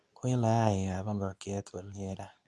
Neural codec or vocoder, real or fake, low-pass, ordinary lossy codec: codec, 24 kHz, 0.9 kbps, WavTokenizer, medium speech release version 2; fake; none; none